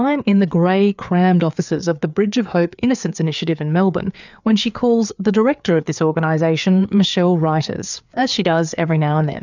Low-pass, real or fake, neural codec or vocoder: 7.2 kHz; fake; codec, 16 kHz, 4 kbps, FreqCodec, larger model